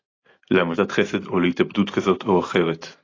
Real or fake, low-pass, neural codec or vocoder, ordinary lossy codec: real; 7.2 kHz; none; AAC, 32 kbps